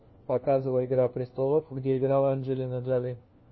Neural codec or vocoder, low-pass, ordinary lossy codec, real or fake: codec, 16 kHz, 1 kbps, FunCodec, trained on LibriTTS, 50 frames a second; 7.2 kHz; MP3, 24 kbps; fake